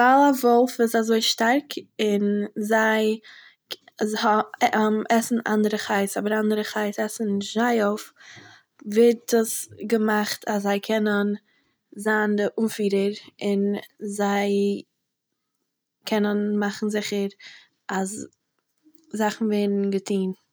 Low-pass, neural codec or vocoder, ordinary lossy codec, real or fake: none; none; none; real